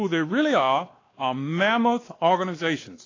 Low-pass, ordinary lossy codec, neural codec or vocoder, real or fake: 7.2 kHz; AAC, 32 kbps; codec, 16 kHz, 2 kbps, X-Codec, WavLM features, trained on Multilingual LibriSpeech; fake